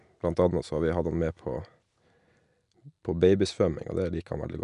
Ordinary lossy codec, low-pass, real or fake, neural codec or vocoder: none; 10.8 kHz; real; none